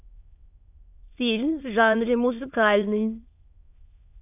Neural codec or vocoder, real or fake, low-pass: autoencoder, 22.05 kHz, a latent of 192 numbers a frame, VITS, trained on many speakers; fake; 3.6 kHz